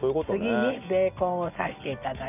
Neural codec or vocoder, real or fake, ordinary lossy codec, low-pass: none; real; none; 3.6 kHz